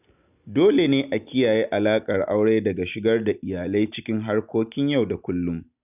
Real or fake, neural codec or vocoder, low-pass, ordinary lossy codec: real; none; 3.6 kHz; none